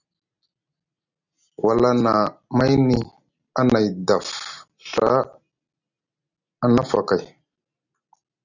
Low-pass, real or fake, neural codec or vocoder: 7.2 kHz; real; none